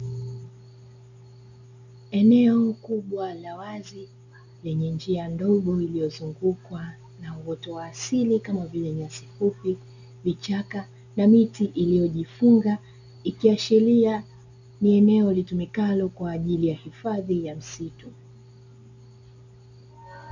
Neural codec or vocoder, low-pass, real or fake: none; 7.2 kHz; real